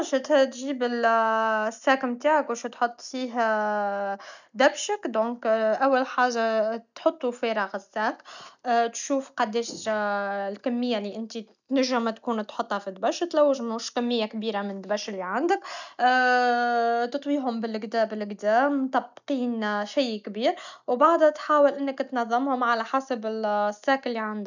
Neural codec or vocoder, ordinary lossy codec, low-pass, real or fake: none; none; 7.2 kHz; real